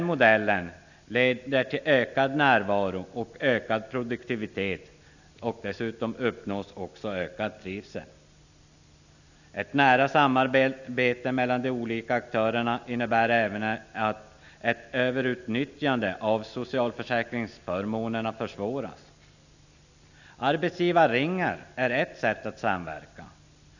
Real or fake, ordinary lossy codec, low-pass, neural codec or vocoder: real; none; 7.2 kHz; none